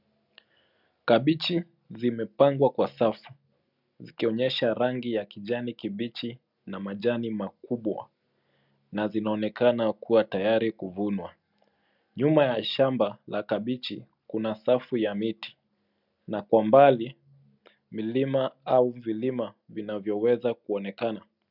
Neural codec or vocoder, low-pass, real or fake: none; 5.4 kHz; real